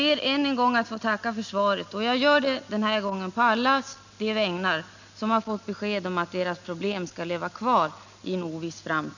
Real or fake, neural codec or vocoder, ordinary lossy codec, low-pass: real; none; none; 7.2 kHz